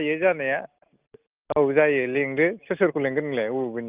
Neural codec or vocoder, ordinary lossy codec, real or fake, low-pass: none; Opus, 24 kbps; real; 3.6 kHz